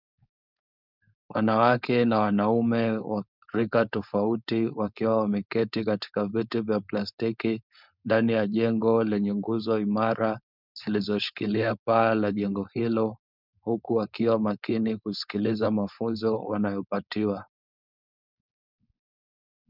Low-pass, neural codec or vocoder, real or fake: 5.4 kHz; codec, 16 kHz, 4.8 kbps, FACodec; fake